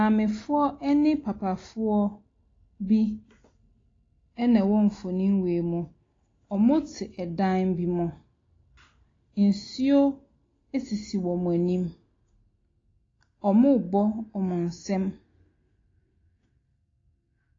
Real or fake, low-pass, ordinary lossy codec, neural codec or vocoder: real; 7.2 kHz; AAC, 32 kbps; none